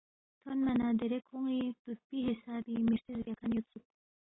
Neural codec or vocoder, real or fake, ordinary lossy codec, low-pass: none; real; AAC, 16 kbps; 7.2 kHz